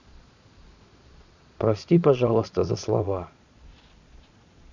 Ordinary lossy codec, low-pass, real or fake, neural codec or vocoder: none; 7.2 kHz; fake; vocoder, 22.05 kHz, 80 mel bands, Vocos